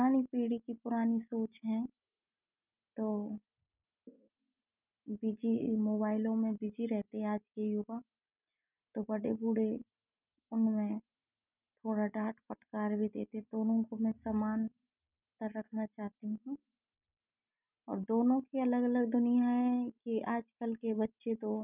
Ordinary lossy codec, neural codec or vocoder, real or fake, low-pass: none; none; real; 3.6 kHz